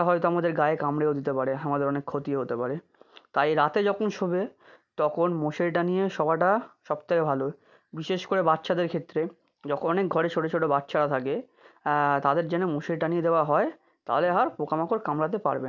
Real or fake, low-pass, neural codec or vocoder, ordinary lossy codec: real; 7.2 kHz; none; none